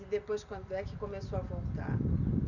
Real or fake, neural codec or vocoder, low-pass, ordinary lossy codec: real; none; 7.2 kHz; none